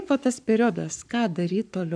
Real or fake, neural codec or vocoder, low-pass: fake; codec, 44.1 kHz, 7.8 kbps, Pupu-Codec; 9.9 kHz